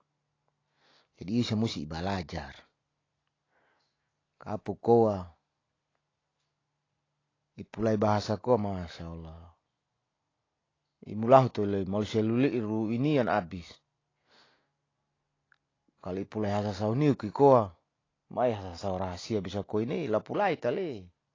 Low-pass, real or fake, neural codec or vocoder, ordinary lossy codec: 7.2 kHz; real; none; AAC, 32 kbps